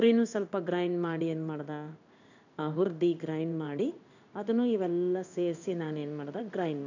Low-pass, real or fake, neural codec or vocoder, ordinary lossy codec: 7.2 kHz; fake; codec, 16 kHz in and 24 kHz out, 1 kbps, XY-Tokenizer; none